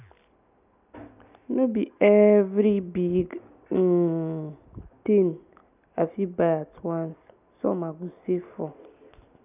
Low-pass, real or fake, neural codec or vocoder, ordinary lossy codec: 3.6 kHz; real; none; none